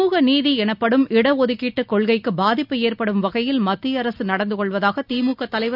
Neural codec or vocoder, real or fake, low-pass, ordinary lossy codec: none; real; 5.4 kHz; none